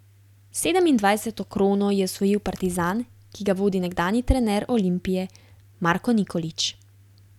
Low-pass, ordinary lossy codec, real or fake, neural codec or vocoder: 19.8 kHz; none; real; none